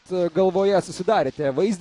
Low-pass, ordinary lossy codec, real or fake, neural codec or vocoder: 10.8 kHz; AAC, 48 kbps; real; none